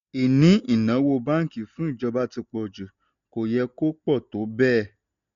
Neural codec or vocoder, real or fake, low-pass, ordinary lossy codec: none; real; 7.2 kHz; none